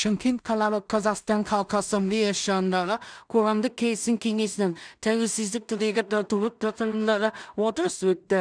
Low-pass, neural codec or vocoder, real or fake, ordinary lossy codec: 9.9 kHz; codec, 16 kHz in and 24 kHz out, 0.4 kbps, LongCat-Audio-Codec, two codebook decoder; fake; none